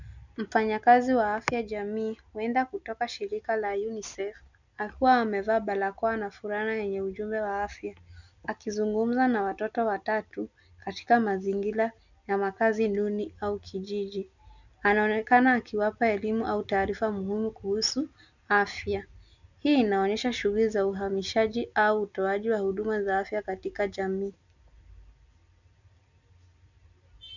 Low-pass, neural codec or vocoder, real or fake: 7.2 kHz; none; real